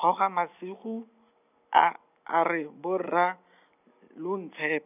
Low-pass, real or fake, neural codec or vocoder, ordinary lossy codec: 3.6 kHz; real; none; none